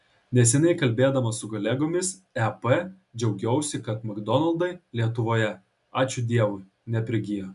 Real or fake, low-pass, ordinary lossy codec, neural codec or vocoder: real; 10.8 kHz; MP3, 96 kbps; none